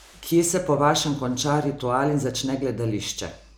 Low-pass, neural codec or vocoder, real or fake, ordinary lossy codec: none; none; real; none